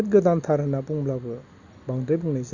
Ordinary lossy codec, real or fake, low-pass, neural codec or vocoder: Opus, 64 kbps; real; 7.2 kHz; none